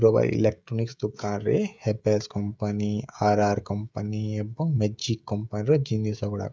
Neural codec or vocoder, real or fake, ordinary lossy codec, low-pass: codec, 16 kHz, 16 kbps, FreqCodec, smaller model; fake; none; none